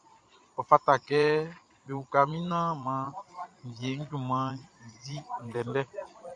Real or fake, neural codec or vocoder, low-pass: fake; vocoder, 22.05 kHz, 80 mel bands, Vocos; 9.9 kHz